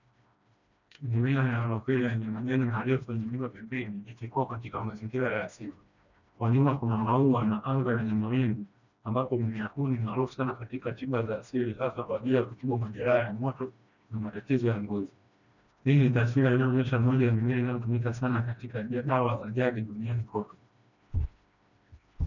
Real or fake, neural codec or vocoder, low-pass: fake; codec, 16 kHz, 1 kbps, FreqCodec, smaller model; 7.2 kHz